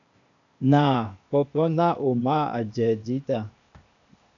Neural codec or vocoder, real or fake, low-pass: codec, 16 kHz, 0.8 kbps, ZipCodec; fake; 7.2 kHz